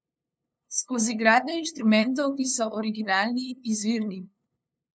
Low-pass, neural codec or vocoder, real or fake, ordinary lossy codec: none; codec, 16 kHz, 2 kbps, FunCodec, trained on LibriTTS, 25 frames a second; fake; none